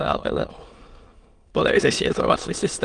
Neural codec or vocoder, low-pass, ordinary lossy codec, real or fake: autoencoder, 22.05 kHz, a latent of 192 numbers a frame, VITS, trained on many speakers; 9.9 kHz; Opus, 24 kbps; fake